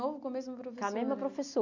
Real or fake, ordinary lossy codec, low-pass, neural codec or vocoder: real; none; 7.2 kHz; none